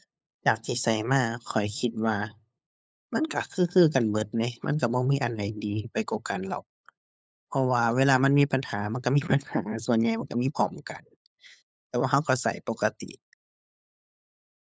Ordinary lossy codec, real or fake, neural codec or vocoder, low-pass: none; fake; codec, 16 kHz, 8 kbps, FunCodec, trained on LibriTTS, 25 frames a second; none